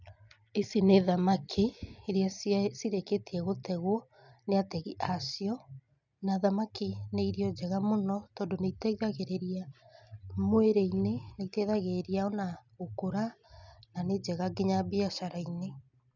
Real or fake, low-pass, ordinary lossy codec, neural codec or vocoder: real; 7.2 kHz; none; none